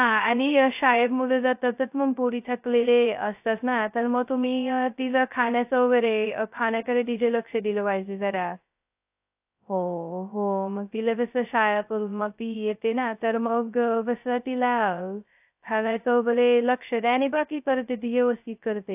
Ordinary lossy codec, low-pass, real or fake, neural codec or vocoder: AAC, 32 kbps; 3.6 kHz; fake; codec, 16 kHz, 0.2 kbps, FocalCodec